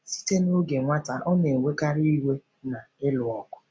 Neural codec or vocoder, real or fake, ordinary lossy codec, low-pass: none; real; none; none